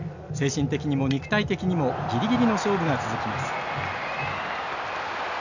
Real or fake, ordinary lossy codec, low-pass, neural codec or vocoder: real; none; 7.2 kHz; none